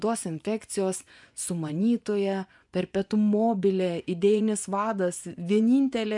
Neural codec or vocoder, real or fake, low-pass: vocoder, 24 kHz, 100 mel bands, Vocos; fake; 10.8 kHz